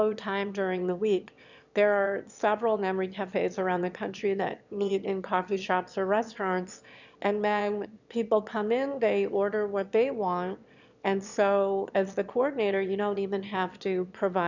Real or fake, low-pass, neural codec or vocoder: fake; 7.2 kHz; autoencoder, 22.05 kHz, a latent of 192 numbers a frame, VITS, trained on one speaker